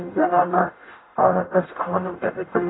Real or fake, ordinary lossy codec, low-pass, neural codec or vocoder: fake; AAC, 16 kbps; 7.2 kHz; codec, 44.1 kHz, 0.9 kbps, DAC